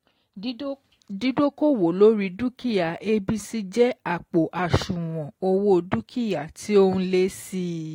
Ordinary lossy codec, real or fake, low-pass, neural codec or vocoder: AAC, 48 kbps; real; 19.8 kHz; none